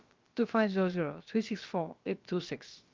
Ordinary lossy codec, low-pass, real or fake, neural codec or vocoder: Opus, 32 kbps; 7.2 kHz; fake; codec, 16 kHz, about 1 kbps, DyCAST, with the encoder's durations